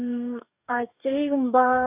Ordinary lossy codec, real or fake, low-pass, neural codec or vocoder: none; fake; 3.6 kHz; codec, 44.1 kHz, 2.6 kbps, DAC